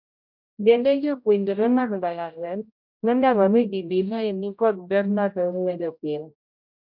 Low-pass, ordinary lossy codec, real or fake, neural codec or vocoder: 5.4 kHz; MP3, 48 kbps; fake; codec, 16 kHz, 0.5 kbps, X-Codec, HuBERT features, trained on general audio